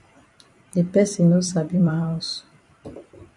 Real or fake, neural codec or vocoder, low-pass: fake; vocoder, 44.1 kHz, 128 mel bands every 256 samples, BigVGAN v2; 10.8 kHz